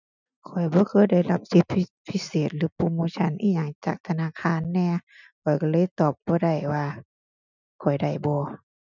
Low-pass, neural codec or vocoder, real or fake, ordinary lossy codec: 7.2 kHz; none; real; none